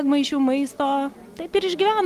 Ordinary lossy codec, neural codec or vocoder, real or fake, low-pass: Opus, 24 kbps; none; real; 14.4 kHz